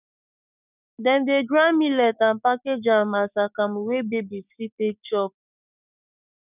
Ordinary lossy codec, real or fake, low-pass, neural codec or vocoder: none; fake; 3.6 kHz; codec, 16 kHz, 6 kbps, DAC